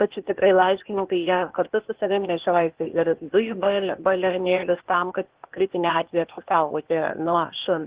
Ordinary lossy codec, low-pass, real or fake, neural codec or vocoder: Opus, 16 kbps; 3.6 kHz; fake; codec, 16 kHz, 0.8 kbps, ZipCodec